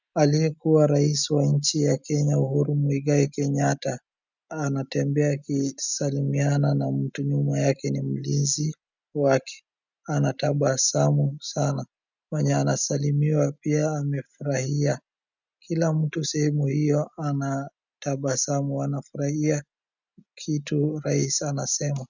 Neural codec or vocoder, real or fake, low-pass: none; real; 7.2 kHz